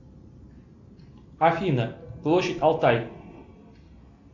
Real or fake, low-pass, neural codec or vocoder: real; 7.2 kHz; none